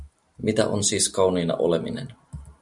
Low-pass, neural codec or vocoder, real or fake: 10.8 kHz; none; real